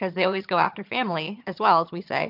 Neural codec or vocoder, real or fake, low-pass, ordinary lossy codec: vocoder, 22.05 kHz, 80 mel bands, HiFi-GAN; fake; 5.4 kHz; MP3, 48 kbps